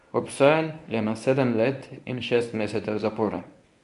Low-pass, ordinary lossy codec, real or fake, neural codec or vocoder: 10.8 kHz; none; fake; codec, 24 kHz, 0.9 kbps, WavTokenizer, medium speech release version 1